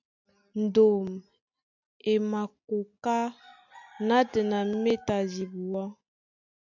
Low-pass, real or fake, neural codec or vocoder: 7.2 kHz; real; none